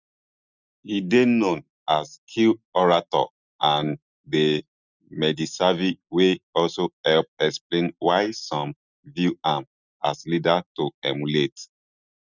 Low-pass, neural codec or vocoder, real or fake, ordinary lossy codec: 7.2 kHz; none; real; none